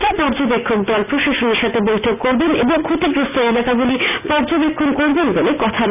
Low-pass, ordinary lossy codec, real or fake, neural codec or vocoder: 3.6 kHz; none; real; none